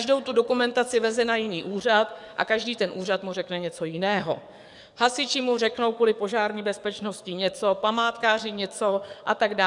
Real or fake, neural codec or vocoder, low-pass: fake; codec, 44.1 kHz, 7.8 kbps, DAC; 10.8 kHz